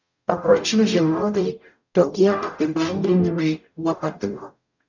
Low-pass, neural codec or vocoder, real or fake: 7.2 kHz; codec, 44.1 kHz, 0.9 kbps, DAC; fake